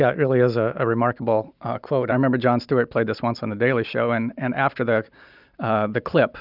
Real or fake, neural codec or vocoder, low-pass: real; none; 5.4 kHz